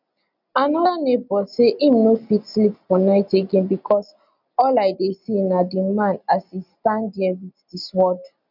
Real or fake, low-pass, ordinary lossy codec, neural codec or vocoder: real; 5.4 kHz; none; none